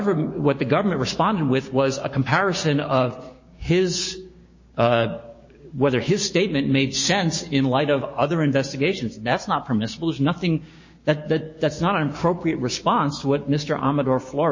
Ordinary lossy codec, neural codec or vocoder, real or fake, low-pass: MP3, 32 kbps; codec, 16 kHz, 6 kbps, DAC; fake; 7.2 kHz